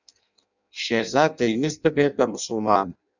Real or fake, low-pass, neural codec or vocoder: fake; 7.2 kHz; codec, 16 kHz in and 24 kHz out, 0.6 kbps, FireRedTTS-2 codec